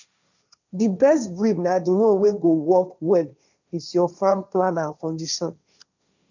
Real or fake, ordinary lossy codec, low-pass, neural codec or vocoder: fake; none; 7.2 kHz; codec, 16 kHz, 1.1 kbps, Voila-Tokenizer